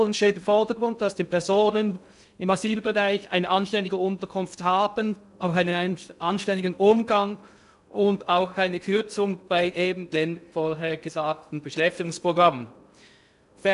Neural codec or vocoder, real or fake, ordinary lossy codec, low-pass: codec, 16 kHz in and 24 kHz out, 0.6 kbps, FocalCodec, streaming, 2048 codes; fake; none; 10.8 kHz